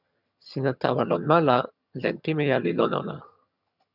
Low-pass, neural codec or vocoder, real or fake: 5.4 kHz; vocoder, 22.05 kHz, 80 mel bands, HiFi-GAN; fake